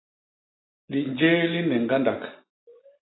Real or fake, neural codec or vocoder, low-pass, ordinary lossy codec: real; none; 7.2 kHz; AAC, 16 kbps